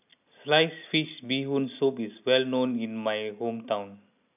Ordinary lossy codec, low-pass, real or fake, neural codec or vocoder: none; 3.6 kHz; real; none